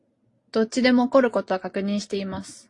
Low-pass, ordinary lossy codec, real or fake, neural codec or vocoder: 10.8 kHz; AAC, 48 kbps; real; none